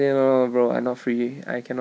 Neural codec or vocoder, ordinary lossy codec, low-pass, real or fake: none; none; none; real